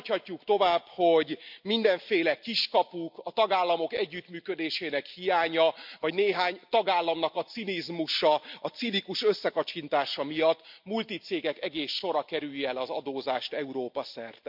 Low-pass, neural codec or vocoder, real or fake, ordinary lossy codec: 5.4 kHz; none; real; MP3, 48 kbps